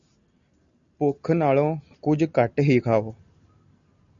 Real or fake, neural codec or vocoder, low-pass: real; none; 7.2 kHz